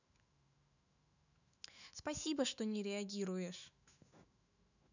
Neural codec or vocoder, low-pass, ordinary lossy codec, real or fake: autoencoder, 48 kHz, 128 numbers a frame, DAC-VAE, trained on Japanese speech; 7.2 kHz; none; fake